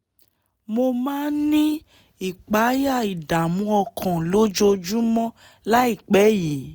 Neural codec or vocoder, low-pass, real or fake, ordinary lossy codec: none; none; real; none